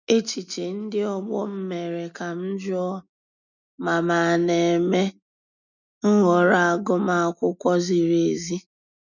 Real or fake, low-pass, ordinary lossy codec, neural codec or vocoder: real; 7.2 kHz; none; none